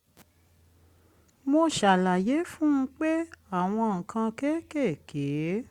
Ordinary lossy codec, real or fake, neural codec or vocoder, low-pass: Opus, 64 kbps; real; none; 19.8 kHz